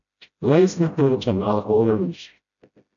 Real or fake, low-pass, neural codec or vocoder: fake; 7.2 kHz; codec, 16 kHz, 0.5 kbps, FreqCodec, smaller model